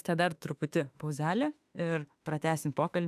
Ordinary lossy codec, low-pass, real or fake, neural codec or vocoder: AAC, 96 kbps; 14.4 kHz; fake; autoencoder, 48 kHz, 32 numbers a frame, DAC-VAE, trained on Japanese speech